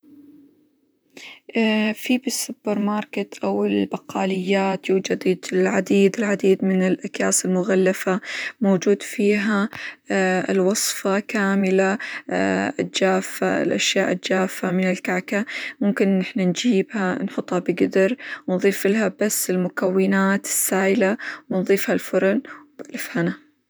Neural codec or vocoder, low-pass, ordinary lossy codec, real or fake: vocoder, 44.1 kHz, 128 mel bands, Pupu-Vocoder; none; none; fake